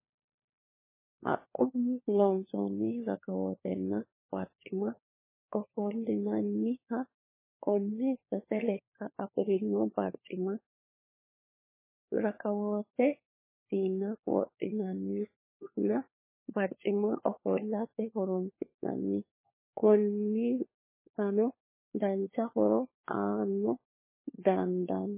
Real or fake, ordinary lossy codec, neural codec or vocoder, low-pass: fake; MP3, 16 kbps; codec, 16 kHz, 4 kbps, FunCodec, trained on LibriTTS, 50 frames a second; 3.6 kHz